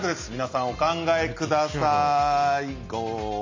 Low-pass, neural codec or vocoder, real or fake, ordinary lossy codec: 7.2 kHz; none; real; MP3, 32 kbps